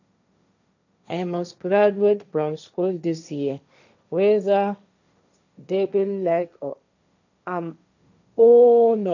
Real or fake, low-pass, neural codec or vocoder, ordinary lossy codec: fake; 7.2 kHz; codec, 16 kHz, 1.1 kbps, Voila-Tokenizer; none